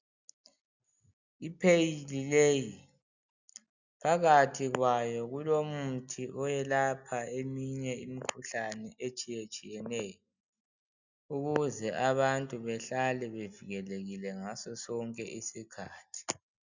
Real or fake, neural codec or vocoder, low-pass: real; none; 7.2 kHz